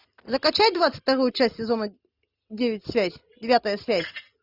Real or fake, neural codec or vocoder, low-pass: real; none; 5.4 kHz